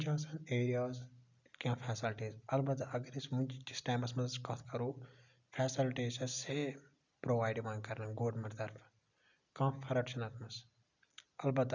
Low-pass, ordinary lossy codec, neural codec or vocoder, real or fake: 7.2 kHz; none; none; real